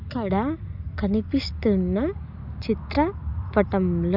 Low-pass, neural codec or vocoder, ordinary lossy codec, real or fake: 5.4 kHz; none; none; real